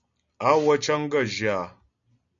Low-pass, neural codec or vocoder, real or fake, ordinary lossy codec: 7.2 kHz; none; real; MP3, 96 kbps